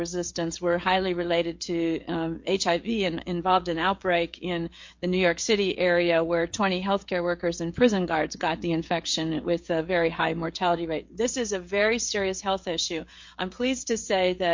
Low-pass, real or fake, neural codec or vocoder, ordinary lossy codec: 7.2 kHz; fake; codec, 16 kHz, 16 kbps, FreqCodec, smaller model; MP3, 48 kbps